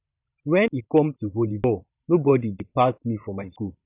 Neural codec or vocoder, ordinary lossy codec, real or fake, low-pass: vocoder, 44.1 kHz, 128 mel bands every 512 samples, BigVGAN v2; none; fake; 3.6 kHz